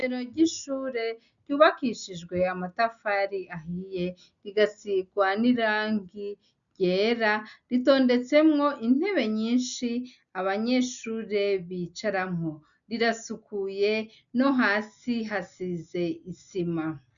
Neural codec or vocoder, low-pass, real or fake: none; 7.2 kHz; real